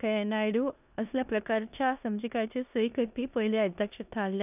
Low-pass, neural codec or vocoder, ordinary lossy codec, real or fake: 3.6 kHz; codec, 16 kHz in and 24 kHz out, 0.9 kbps, LongCat-Audio-Codec, four codebook decoder; none; fake